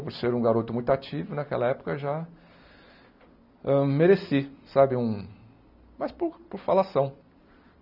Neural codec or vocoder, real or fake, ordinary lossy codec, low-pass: none; real; none; 5.4 kHz